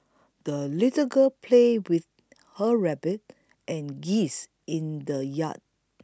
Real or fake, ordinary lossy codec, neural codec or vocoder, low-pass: real; none; none; none